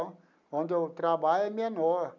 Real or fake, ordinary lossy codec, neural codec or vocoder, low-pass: real; none; none; 7.2 kHz